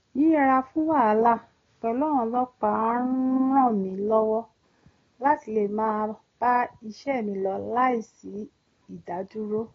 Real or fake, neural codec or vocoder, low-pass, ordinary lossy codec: real; none; 7.2 kHz; AAC, 32 kbps